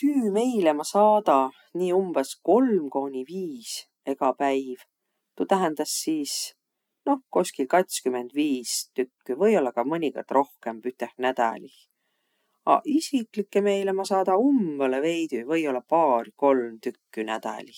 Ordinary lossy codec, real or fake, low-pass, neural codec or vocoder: none; real; 19.8 kHz; none